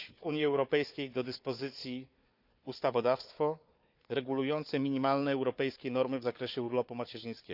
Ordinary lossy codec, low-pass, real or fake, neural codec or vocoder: none; 5.4 kHz; fake; codec, 16 kHz, 4 kbps, FunCodec, trained on Chinese and English, 50 frames a second